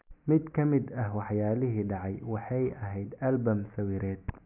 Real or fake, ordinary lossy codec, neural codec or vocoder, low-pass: real; none; none; 3.6 kHz